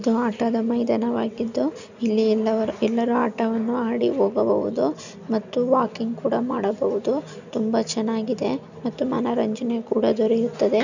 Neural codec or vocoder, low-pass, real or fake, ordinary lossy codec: none; 7.2 kHz; real; none